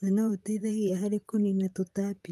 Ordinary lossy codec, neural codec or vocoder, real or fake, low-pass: Opus, 32 kbps; vocoder, 44.1 kHz, 128 mel bands, Pupu-Vocoder; fake; 14.4 kHz